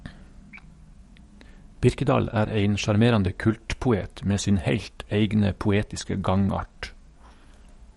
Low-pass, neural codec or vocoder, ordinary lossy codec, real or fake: 19.8 kHz; codec, 44.1 kHz, 7.8 kbps, DAC; MP3, 48 kbps; fake